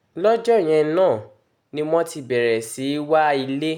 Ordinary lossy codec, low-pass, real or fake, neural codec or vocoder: none; 19.8 kHz; real; none